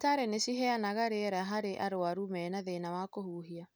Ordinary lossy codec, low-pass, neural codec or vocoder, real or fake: none; none; none; real